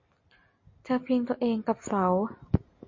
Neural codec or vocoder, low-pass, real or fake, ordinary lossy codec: none; 7.2 kHz; real; MP3, 32 kbps